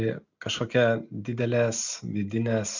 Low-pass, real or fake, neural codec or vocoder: 7.2 kHz; real; none